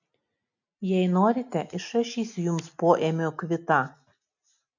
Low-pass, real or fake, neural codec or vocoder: 7.2 kHz; real; none